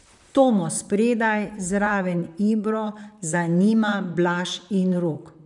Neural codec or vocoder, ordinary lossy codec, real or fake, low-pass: vocoder, 44.1 kHz, 128 mel bands, Pupu-Vocoder; none; fake; 10.8 kHz